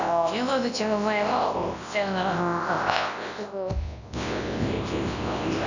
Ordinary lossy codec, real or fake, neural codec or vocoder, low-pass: none; fake; codec, 24 kHz, 0.9 kbps, WavTokenizer, large speech release; 7.2 kHz